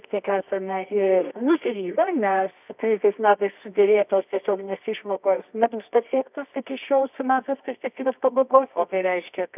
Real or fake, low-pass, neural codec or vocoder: fake; 3.6 kHz; codec, 24 kHz, 0.9 kbps, WavTokenizer, medium music audio release